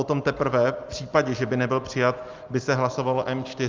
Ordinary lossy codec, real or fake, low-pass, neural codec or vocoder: Opus, 24 kbps; real; 7.2 kHz; none